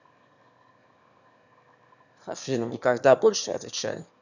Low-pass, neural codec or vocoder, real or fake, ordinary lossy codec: 7.2 kHz; autoencoder, 22.05 kHz, a latent of 192 numbers a frame, VITS, trained on one speaker; fake; none